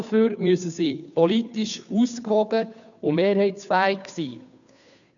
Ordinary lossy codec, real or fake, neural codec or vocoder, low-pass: none; fake; codec, 16 kHz, 2 kbps, FunCodec, trained on Chinese and English, 25 frames a second; 7.2 kHz